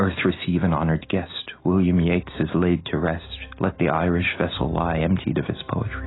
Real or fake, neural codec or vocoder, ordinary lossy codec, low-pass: real; none; AAC, 16 kbps; 7.2 kHz